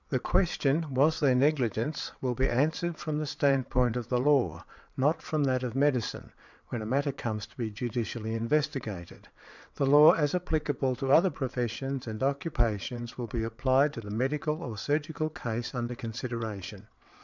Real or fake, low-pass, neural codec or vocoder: fake; 7.2 kHz; vocoder, 22.05 kHz, 80 mel bands, WaveNeXt